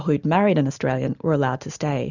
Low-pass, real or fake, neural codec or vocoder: 7.2 kHz; real; none